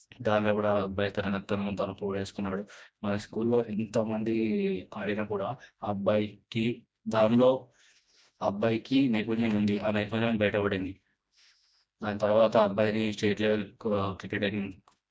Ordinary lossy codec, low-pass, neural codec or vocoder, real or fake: none; none; codec, 16 kHz, 1 kbps, FreqCodec, smaller model; fake